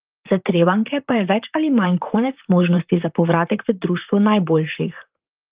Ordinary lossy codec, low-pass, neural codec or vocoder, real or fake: Opus, 24 kbps; 3.6 kHz; vocoder, 44.1 kHz, 128 mel bands, Pupu-Vocoder; fake